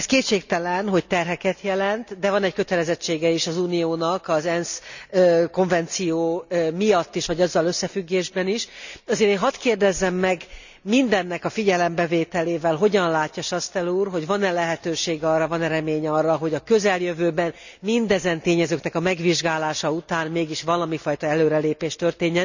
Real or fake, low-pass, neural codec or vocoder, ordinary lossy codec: real; 7.2 kHz; none; none